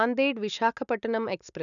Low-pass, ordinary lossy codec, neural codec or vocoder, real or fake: 7.2 kHz; none; none; real